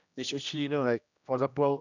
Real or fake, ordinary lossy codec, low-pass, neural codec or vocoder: fake; none; 7.2 kHz; codec, 16 kHz, 1 kbps, X-Codec, HuBERT features, trained on general audio